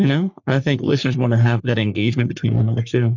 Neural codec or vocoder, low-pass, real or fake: codec, 44.1 kHz, 3.4 kbps, Pupu-Codec; 7.2 kHz; fake